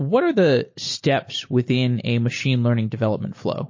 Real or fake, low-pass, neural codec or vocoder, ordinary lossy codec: real; 7.2 kHz; none; MP3, 32 kbps